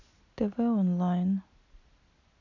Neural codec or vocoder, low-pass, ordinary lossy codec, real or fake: none; 7.2 kHz; none; real